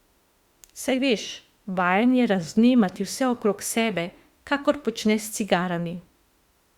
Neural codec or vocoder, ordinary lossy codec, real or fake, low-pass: autoencoder, 48 kHz, 32 numbers a frame, DAC-VAE, trained on Japanese speech; Opus, 64 kbps; fake; 19.8 kHz